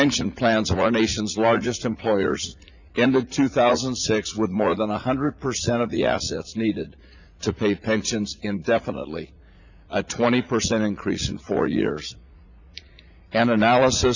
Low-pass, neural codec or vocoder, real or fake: 7.2 kHz; vocoder, 44.1 kHz, 80 mel bands, Vocos; fake